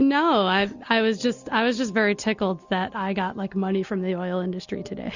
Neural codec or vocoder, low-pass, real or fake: none; 7.2 kHz; real